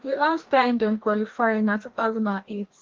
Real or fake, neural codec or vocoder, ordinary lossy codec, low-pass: fake; codec, 16 kHz, 1 kbps, FreqCodec, larger model; Opus, 16 kbps; 7.2 kHz